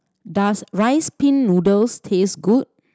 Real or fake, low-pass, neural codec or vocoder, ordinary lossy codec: real; none; none; none